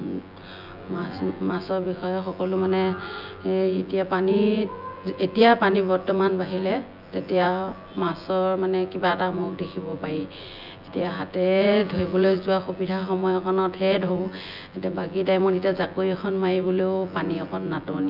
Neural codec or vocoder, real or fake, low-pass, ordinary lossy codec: vocoder, 24 kHz, 100 mel bands, Vocos; fake; 5.4 kHz; none